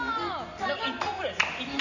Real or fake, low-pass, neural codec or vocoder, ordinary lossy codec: real; 7.2 kHz; none; none